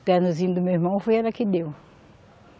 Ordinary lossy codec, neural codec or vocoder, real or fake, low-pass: none; none; real; none